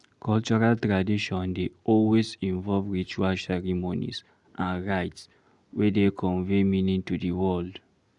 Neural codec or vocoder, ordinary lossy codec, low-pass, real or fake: none; Opus, 64 kbps; 10.8 kHz; real